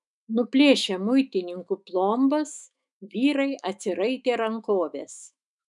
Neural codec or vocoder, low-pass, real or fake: autoencoder, 48 kHz, 128 numbers a frame, DAC-VAE, trained on Japanese speech; 10.8 kHz; fake